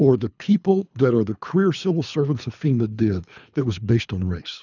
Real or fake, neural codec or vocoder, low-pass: fake; codec, 24 kHz, 3 kbps, HILCodec; 7.2 kHz